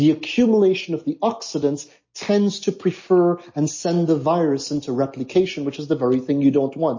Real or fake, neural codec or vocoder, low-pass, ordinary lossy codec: real; none; 7.2 kHz; MP3, 32 kbps